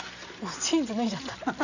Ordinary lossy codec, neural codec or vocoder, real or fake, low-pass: none; none; real; 7.2 kHz